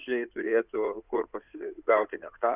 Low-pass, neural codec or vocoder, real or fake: 3.6 kHz; codec, 16 kHz in and 24 kHz out, 2.2 kbps, FireRedTTS-2 codec; fake